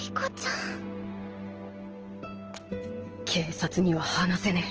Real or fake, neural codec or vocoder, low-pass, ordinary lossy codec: real; none; 7.2 kHz; Opus, 16 kbps